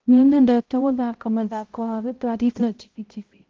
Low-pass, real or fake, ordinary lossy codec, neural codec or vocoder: 7.2 kHz; fake; Opus, 16 kbps; codec, 16 kHz, 0.5 kbps, X-Codec, HuBERT features, trained on balanced general audio